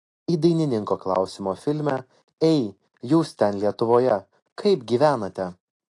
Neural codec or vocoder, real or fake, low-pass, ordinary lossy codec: none; real; 10.8 kHz; AAC, 48 kbps